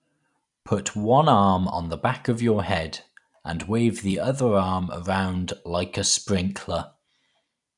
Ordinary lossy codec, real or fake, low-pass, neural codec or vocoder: none; fake; 10.8 kHz; vocoder, 44.1 kHz, 128 mel bands every 256 samples, BigVGAN v2